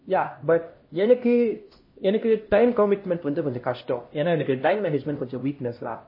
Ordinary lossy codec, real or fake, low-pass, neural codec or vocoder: MP3, 24 kbps; fake; 5.4 kHz; codec, 16 kHz, 1 kbps, X-Codec, HuBERT features, trained on LibriSpeech